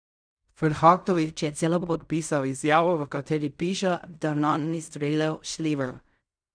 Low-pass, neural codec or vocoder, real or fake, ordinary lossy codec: 9.9 kHz; codec, 16 kHz in and 24 kHz out, 0.4 kbps, LongCat-Audio-Codec, fine tuned four codebook decoder; fake; none